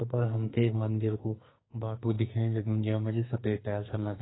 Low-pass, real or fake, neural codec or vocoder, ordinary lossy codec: 7.2 kHz; fake; codec, 44.1 kHz, 2.6 kbps, SNAC; AAC, 16 kbps